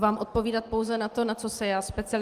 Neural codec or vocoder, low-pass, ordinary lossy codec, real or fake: none; 14.4 kHz; Opus, 24 kbps; real